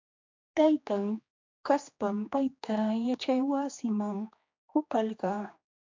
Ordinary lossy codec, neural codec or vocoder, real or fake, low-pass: MP3, 64 kbps; codec, 16 kHz, 2 kbps, X-Codec, HuBERT features, trained on general audio; fake; 7.2 kHz